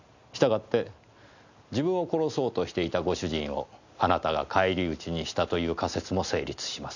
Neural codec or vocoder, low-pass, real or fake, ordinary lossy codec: none; 7.2 kHz; real; none